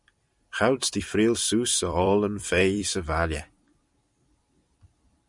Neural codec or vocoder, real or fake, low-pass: vocoder, 44.1 kHz, 128 mel bands every 256 samples, BigVGAN v2; fake; 10.8 kHz